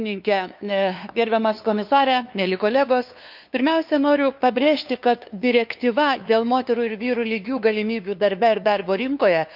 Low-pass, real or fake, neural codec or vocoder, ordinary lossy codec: 5.4 kHz; fake; codec, 16 kHz, 2 kbps, FunCodec, trained on LibriTTS, 25 frames a second; none